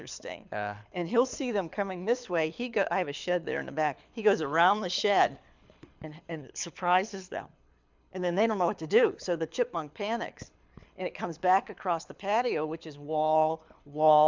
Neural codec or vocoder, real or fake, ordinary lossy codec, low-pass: codec, 24 kHz, 6 kbps, HILCodec; fake; MP3, 64 kbps; 7.2 kHz